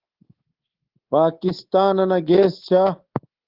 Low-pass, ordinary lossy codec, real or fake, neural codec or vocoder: 5.4 kHz; Opus, 16 kbps; fake; codec, 24 kHz, 3.1 kbps, DualCodec